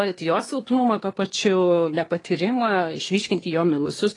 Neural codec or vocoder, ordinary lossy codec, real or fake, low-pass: codec, 24 kHz, 1 kbps, SNAC; AAC, 32 kbps; fake; 10.8 kHz